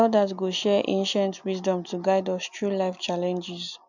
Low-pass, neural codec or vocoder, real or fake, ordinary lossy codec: 7.2 kHz; none; real; none